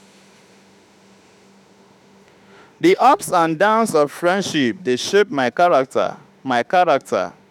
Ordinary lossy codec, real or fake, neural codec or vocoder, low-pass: none; fake; autoencoder, 48 kHz, 32 numbers a frame, DAC-VAE, trained on Japanese speech; 19.8 kHz